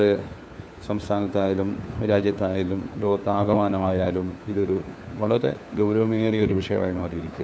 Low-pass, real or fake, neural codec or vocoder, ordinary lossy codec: none; fake; codec, 16 kHz, 4 kbps, FunCodec, trained on LibriTTS, 50 frames a second; none